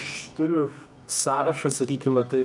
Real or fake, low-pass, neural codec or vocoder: fake; 10.8 kHz; codec, 24 kHz, 0.9 kbps, WavTokenizer, medium music audio release